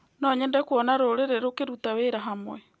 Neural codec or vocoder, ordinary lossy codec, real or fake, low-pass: none; none; real; none